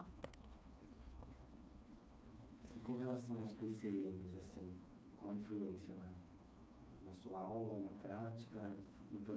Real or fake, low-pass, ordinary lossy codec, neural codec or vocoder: fake; none; none; codec, 16 kHz, 2 kbps, FreqCodec, smaller model